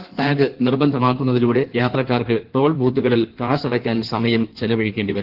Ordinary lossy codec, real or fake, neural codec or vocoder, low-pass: Opus, 16 kbps; fake; codec, 16 kHz in and 24 kHz out, 1.1 kbps, FireRedTTS-2 codec; 5.4 kHz